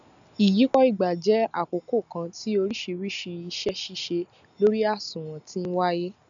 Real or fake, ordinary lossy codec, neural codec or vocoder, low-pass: real; none; none; 7.2 kHz